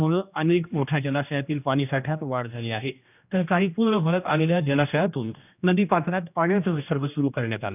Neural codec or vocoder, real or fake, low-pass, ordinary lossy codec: codec, 16 kHz, 1 kbps, X-Codec, HuBERT features, trained on general audio; fake; 3.6 kHz; none